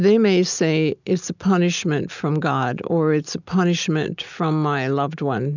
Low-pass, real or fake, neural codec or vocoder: 7.2 kHz; real; none